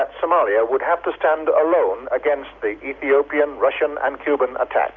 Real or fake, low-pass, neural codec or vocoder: real; 7.2 kHz; none